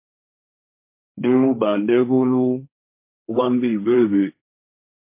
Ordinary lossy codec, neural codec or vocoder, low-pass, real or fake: MP3, 24 kbps; codec, 16 kHz, 1.1 kbps, Voila-Tokenizer; 3.6 kHz; fake